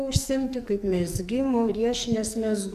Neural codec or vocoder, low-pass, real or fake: codec, 44.1 kHz, 2.6 kbps, SNAC; 14.4 kHz; fake